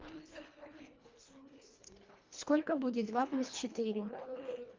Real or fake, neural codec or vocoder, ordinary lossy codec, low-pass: fake; codec, 24 kHz, 1.5 kbps, HILCodec; Opus, 32 kbps; 7.2 kHz